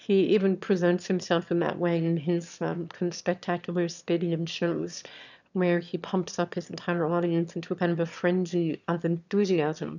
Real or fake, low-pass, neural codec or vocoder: fake; 7.2 kHz; autoencoder, 22.05 kHz, a latent of 192 numbers a frame, VITS, trained on one speaker